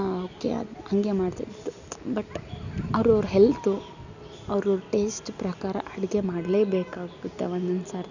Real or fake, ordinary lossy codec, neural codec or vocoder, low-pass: real; none; none; 7.2 kHz